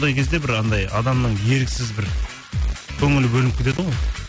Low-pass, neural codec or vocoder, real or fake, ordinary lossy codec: none; none; real; none